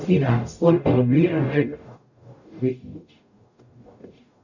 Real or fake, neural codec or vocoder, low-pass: fake; codec, 44.1 kHz, 0.9 kbps, DAC; 7.2 kHz